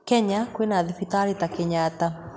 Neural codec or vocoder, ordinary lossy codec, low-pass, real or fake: none; none; none; real